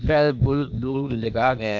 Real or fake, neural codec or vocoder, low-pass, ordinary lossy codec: fake; codec, 16 kHz, 0.8 kbps, ZipCodec; 7.2 kHz; none